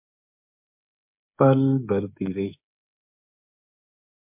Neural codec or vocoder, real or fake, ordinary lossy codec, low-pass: codec, 16 kHz, 16 kbps, FreqCodec, smaller model; fake; MP3, 32 kbps; 3.6 kHz